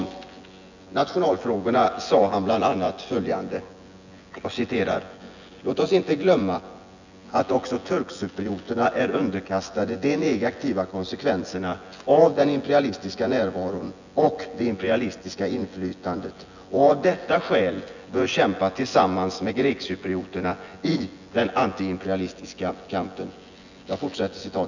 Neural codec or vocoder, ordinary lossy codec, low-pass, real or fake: vocoder, 24 kHz, 100 mel bands, Vocos; none; 7.2 kHz; fake